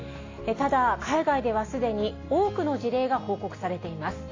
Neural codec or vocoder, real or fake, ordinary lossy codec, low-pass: none; real; AAC, 32 kbps; 7.2 kHz